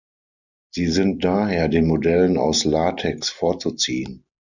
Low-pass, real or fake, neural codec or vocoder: 7.2 kHz; real; none